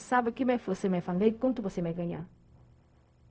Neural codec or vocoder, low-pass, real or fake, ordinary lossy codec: codec, 16 kHz, 0.4 kbps, LongCat-Audio-Codec; none; fake; none